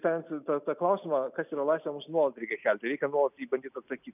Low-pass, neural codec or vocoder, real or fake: 3.6 kHz; none; real